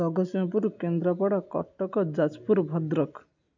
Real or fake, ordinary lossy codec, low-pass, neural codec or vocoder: real; none; 7.2 kHz; none